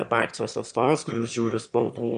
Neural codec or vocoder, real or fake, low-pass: autoencoder, 22.05 kHz, a latent of 192 numbers a frame, VITS, trained on one speaker; fake; 9.9 kHz